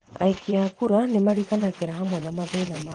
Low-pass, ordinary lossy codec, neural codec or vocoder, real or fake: 9.9 kHz; Opus, 24 kbps; vocoder, 22.05 kHz, 80 mel bands, WaveNeXt; fake